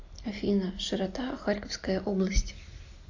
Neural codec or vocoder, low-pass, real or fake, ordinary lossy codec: none; 7.2 kHz; real; AAC, 48 kbps